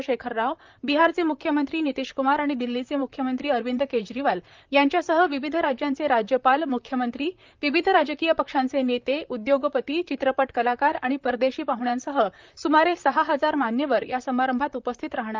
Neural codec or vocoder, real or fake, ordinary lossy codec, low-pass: vocoder, 44.1 kHz, 128 mel bands, Pupu-Vocoder; fake; Opus, 24 kbps; 7.2 kHz